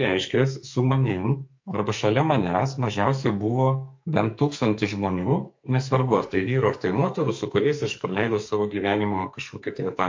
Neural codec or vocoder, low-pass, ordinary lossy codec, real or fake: codec, 44.1 kHz, 2.6 kbps, SNAC; 7.2 kHz; MP3, 48 kbps; fake